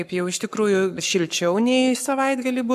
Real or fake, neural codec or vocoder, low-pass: fake; codec, 44.1 kHz, 7.8 kbps, Pupu-Codec; 14.4 kHz